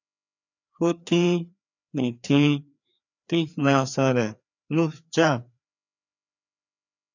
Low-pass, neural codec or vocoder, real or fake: 7.2 kHz; codec, 16 kHz, 2 kbps, FreqCodec, larger model; fake